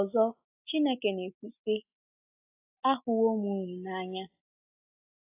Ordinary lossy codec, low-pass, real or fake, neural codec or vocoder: AAC, 32 kbps; 3.6 kHz; real; none